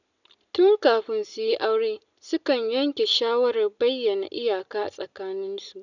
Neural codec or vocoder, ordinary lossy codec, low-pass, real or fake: none; none; 7.2 kHz; real